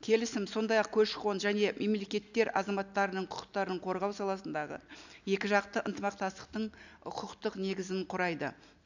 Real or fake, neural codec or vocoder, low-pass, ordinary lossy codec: real; none; 7.2 kHz; none